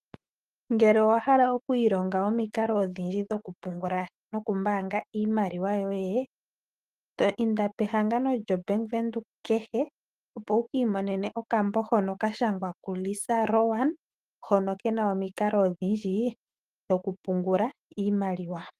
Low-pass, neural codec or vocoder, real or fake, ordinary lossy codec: 14.4 kHz; autoencoder, 48 kHz, 128 numbers a frame, DAC-VAE, trained on Japanese speech; fake; Opus, 24 kbps